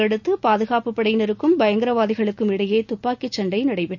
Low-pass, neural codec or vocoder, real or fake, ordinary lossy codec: 7.2 kHz; none; real; none